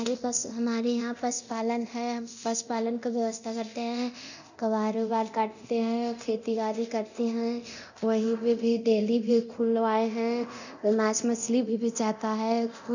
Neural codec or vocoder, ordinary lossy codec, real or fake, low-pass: codec, 24 kHz, 0.9 kbps, DualCodec; none; fake; 7.2 kHz